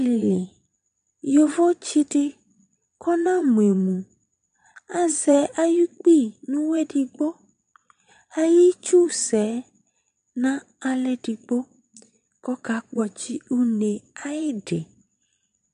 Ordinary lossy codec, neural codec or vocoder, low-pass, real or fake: MP3, 64 kbps; vocoder, 22.05 kHz, 80 mel bands, Vocos; 9.9 kHz; fake